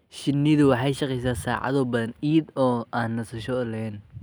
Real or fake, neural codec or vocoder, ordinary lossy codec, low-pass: real; none; none; none